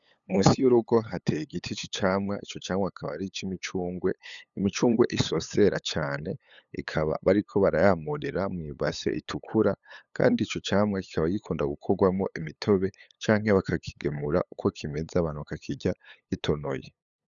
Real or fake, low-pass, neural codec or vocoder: fake; 7.2 kHz; codec, 16 kHz, 8 kbps, FunCodec, trained on LibriTTS, 25 frames a second